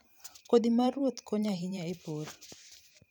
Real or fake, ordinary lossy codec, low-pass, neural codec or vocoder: fake; none; none; vocoder, 44.1 kHz, 128 mel bands every 512 samples, BigVGAN v2